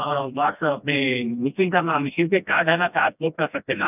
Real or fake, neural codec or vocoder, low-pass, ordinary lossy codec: fake; codec, 16 kHz, 1 kbps, FreqCodec, smaller model; 3.6 kHz; none